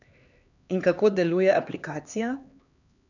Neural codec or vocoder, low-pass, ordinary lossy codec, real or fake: codec, 16 kHz, 2 kbps, X-Codec, HuBERT features, trained on LibriSpeech; 7.2 kHz; none; fake